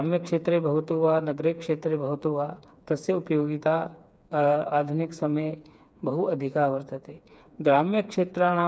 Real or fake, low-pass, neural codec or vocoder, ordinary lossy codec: fake; none; codec, 16 kHz, 4 kbps, FreqCodec, smaller model; none